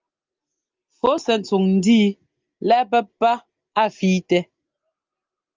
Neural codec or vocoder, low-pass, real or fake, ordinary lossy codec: none; 7.2 kHz; real; Opus, 32 kbps